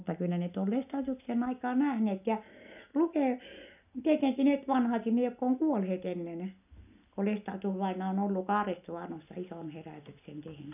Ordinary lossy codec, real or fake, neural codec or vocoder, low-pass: none; real; none; 3.6 kHz